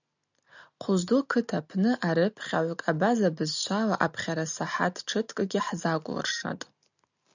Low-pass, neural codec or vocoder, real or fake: 7.2 kHz; none; real